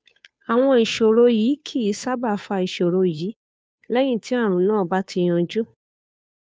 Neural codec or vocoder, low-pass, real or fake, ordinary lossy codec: codec, 16 kHz, 2 kbps, FunCodec, trained on Chinese and English, 25 frames a second; none; fake; none